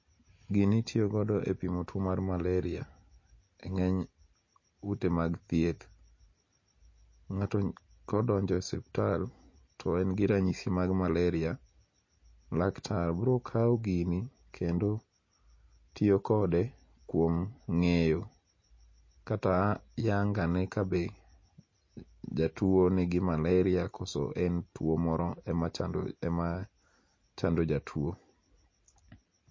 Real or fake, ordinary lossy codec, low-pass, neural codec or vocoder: real; MP3, 32 kbps; 7.2 kHz; none